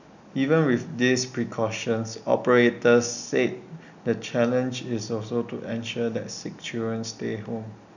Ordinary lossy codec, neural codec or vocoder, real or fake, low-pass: none; none; real; 7.2 kHz